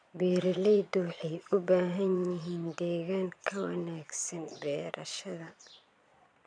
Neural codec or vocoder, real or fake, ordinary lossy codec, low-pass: vocoder, 22.05 kHz, 80 mel bands, Vocos; fake; none; 9.9 kHz